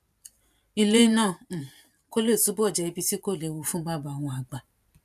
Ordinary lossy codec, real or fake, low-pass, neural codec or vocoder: none; fake; 14.4 kHz; vocoder, 44.1 kHz, 128 mel bands every 512 samples, BigVGAN v2